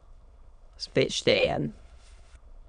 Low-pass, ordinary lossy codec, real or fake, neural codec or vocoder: 9.9 kHz; none; fake; autoencoder, 22.05 kHz, a latent of 192 numbers a frame, VITS, trained on many speakers